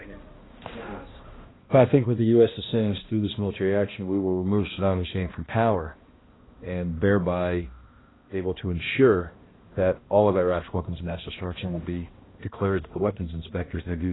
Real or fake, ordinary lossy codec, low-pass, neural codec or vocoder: fake; AAC, 16 kbps; 7.2 kHz; codec, 16 kHz, 1 kbps, X-Codec, HuBERT features, trained on balanced general audio